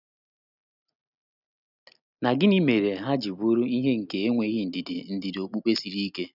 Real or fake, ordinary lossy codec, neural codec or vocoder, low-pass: real; none; none; 5.4 kHz